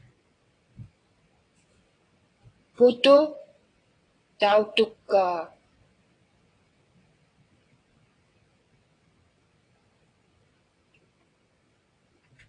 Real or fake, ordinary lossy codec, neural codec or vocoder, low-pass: fake; AAC, 32 kbps; vocoder, 22.05 kHz, 80 mel bands, WaveNeXt; 9.9 kHz